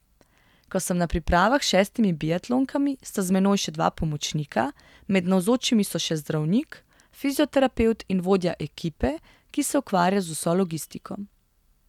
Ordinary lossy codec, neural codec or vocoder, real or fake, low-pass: none; none; real; 19.8 kHz